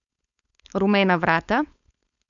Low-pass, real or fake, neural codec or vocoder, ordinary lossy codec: 7.2 kHz; fake; codec, 16 kHz, 4.8 kbps, FACodec; none